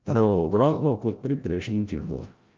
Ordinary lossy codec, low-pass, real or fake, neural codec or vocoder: Opus, 24 kbps; 7.2 kHz; fake; codec, 16 kHz, 0.5 kbps, FreqCodec, larger model